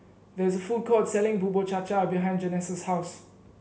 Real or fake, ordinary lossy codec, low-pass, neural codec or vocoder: real; none; none; none